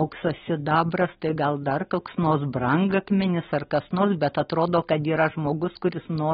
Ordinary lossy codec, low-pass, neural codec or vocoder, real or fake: AAC, 16 kbps; 7.2 kHz; none; real